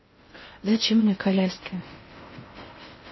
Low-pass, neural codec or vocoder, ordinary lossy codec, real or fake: 7.2 kHz; codec, 16 kHz in and 24 kHz out, 0.6 kbps, FocalCodec, streaming, 4096 codes; MP3, 24 kbps; fake